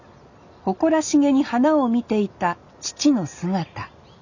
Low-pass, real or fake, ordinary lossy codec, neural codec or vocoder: 7.2 kHz; real; none; none